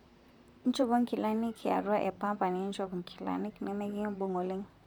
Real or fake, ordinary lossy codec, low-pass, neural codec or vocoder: fake; none; 19.8 kHz; vocoder, 44.1 kHz, 128 mel bands, Pupu-Vocoder